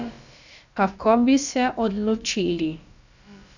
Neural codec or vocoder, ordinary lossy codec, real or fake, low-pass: codec, 16 kHz, about 1 kbps, DyCAST, with the encoder's durations; none; fake; 7.2 kHz